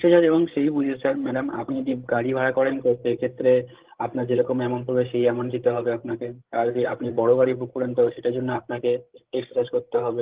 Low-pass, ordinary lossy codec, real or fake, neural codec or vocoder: 3.6 kHz; Opus, 16 kbps; fake; codec, 16 kHz, 16 kbps, FreqCodec, larger model